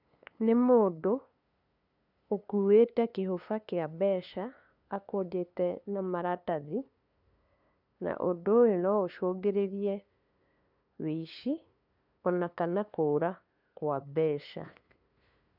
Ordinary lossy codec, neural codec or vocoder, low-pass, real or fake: none; codec, 16 kHz, 2 kbps, FunCodec, trained on LibriTTS, 25 frames a second; 5.4 kHz; fake